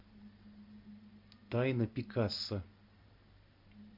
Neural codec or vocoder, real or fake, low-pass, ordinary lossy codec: none; real; 5.4 kHz; MP3, 48 kbps